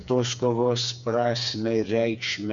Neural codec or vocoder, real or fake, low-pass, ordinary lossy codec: codec, 16 kHz, 4 kbps, FreqCodec, smaller model; fake; 7.2 kHz; MP3, 64 kbps